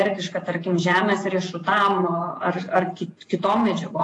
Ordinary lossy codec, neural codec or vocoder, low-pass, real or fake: AAC, 48 kbps; vocoder, 48 kHz, 128 mel bands, Vocos; 10.8 kHz; fake